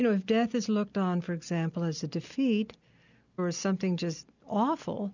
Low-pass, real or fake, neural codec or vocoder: 7.2 kHz; real; none